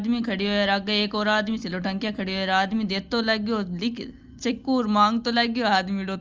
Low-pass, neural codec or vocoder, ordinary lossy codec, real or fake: 7.2 kHz; none; Opus, 24 kbps; real